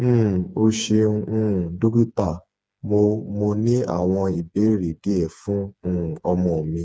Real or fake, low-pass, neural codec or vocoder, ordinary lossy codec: fake; none; codec, 16 kHz, 4 kbps, FreqCodec, smaller model; none